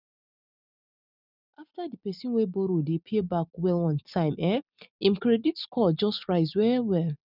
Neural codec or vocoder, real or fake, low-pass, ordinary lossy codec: none; real; 5.4 kHz; none